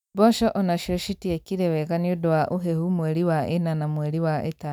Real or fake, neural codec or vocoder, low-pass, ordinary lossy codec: real; none; 19.8 kHz; none